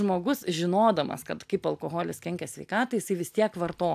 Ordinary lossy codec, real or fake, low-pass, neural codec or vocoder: AAC, 96 kbps; real; 14.4 kHz; none